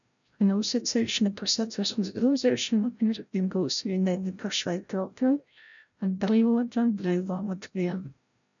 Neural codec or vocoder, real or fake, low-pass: codec, 16 kHz, 0.5 kbps, FreqCodec, larger model; fake; 7.2 kHz